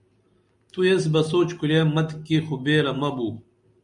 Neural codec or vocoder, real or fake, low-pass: none; real; 10.8 kHz